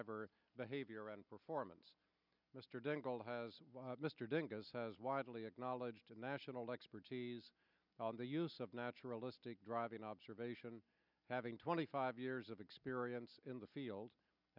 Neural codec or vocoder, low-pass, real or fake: none; 5.4 kHz; real